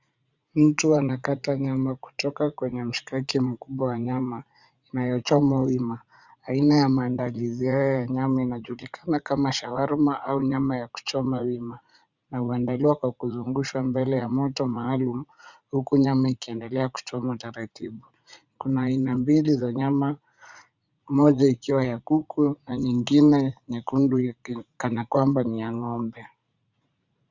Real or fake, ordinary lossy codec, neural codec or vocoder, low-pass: fake; Opus, 64 kbps; vocoder, 44.1 kHz, 80 mel bands, Vocos; 7.2 kHz